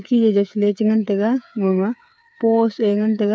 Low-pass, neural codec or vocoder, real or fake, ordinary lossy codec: none; codec, 16 kHz, 8 kbps, FreqCodec, smaller model; fake; none